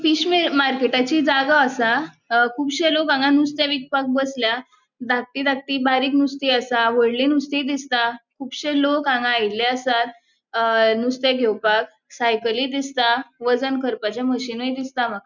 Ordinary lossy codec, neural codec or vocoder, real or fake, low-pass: none; none; real; 7.2 kHz